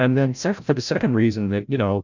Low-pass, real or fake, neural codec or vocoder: 7.2 kHz; fake; codec, 16 kHz, 0.5 kbps, FreqCodec, larger model